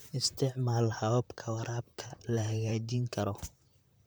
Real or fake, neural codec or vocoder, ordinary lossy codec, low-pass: fake; vocoder, 44.1 kHz, 128 mel bands, Pupu-Vocoder; none; none